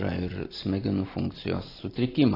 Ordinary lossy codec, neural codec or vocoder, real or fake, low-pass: AAC, 24 kbps; none; real; 5.4 kHz